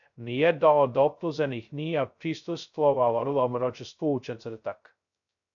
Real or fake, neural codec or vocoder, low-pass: fake; codec, 16 kHz, 0.2 kbps, FocalCodec; 7.2 kHz